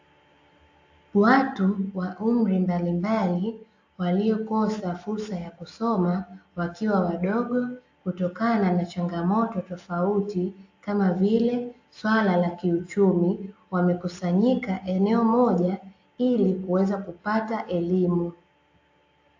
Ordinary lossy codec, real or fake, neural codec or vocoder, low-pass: AAC, 48 kbps; real; none; 7.2 kHz